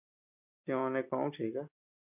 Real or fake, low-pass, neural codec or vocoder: real; 3.6 kHz; none